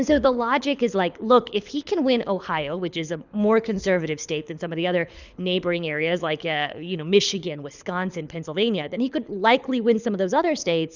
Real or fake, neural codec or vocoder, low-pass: fake; codec, 24 kHz, 6 kbps, HILCodec; 7.2 kHz